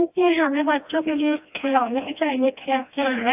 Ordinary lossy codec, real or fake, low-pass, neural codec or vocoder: AAC, 32 kbps; fake; 3.6 kHz; codec, 16 kHz, 1 kbps, FreqCodec, smaller model